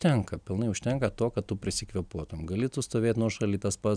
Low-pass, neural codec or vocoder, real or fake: 9.9 kHz; none; real